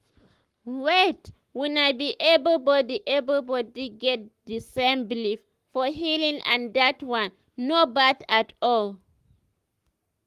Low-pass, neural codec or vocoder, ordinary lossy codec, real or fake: 14.4 kHz; codec, 44.1 kHz, 7.8 kbps, Pupu-Codec; Opus, 24 kbps; fake